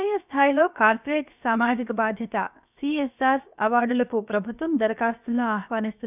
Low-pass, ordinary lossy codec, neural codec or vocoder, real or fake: 3.6 kHz; none; codec, 16 kHz, about 1 kbps, DyCAST, with the encoder's durations; fake